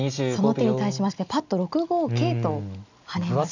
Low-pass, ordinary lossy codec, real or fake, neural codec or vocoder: 7.2 kHz; none; real; none